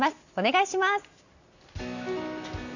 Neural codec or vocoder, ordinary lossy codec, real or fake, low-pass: none; none; real; 7.2 kHz